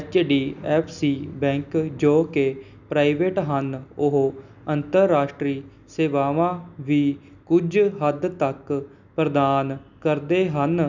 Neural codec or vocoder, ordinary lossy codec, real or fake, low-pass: none; none; real; 7.2 kHz